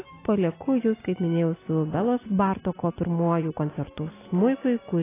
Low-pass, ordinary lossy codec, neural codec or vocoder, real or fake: 3.6 kHz; AAC, 16 kbps; none; real